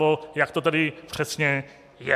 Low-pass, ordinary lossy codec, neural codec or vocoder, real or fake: 14.4 kHz; MP3, 96 kbps; none; real